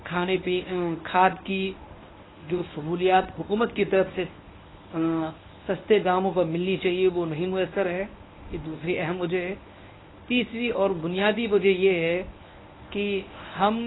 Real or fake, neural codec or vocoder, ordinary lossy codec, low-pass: fake; codec, 24 kHz, 0.9 kbps, WavTokenizer, medium speech release version 1; AAC, 16 kbps; 7.2 kHz